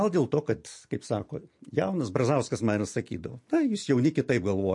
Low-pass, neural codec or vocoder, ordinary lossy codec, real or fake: 10.8 kHz; none; MP3, 48 kbps; real